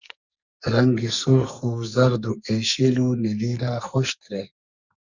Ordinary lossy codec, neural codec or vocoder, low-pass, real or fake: Opus, 64 kbps; codec, 44.1 kHz, 2.6 kbps, SNAC; 7.2 kHz; fake